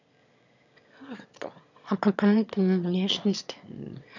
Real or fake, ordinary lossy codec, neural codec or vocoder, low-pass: fake; none; autoencoder, 22.05 kHz, a latent of 192 numbers a frame, VITS, trained on one speaker; 7.2 kHz